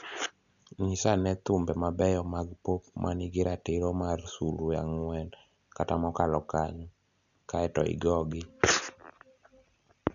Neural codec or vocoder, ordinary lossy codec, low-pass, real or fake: none; none; 7.2 kHz; real